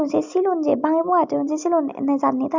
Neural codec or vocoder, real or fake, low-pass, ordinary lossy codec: none; real; 7.2 kHz; MP3, 64 kbps